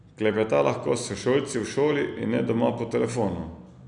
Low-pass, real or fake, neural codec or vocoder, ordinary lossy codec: 9.9 kHz; real; none; none